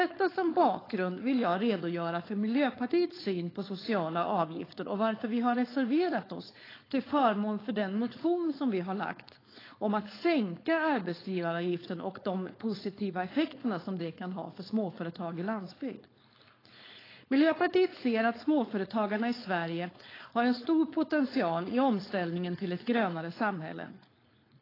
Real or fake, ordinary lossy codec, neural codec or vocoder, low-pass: fake; AAC, 24 kbps; codec, 16 kHz, 4.8 kbps, FACodec; 5.4 kHz